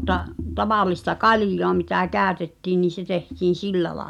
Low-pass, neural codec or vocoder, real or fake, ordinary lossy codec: 19.8 kHz; none; real; none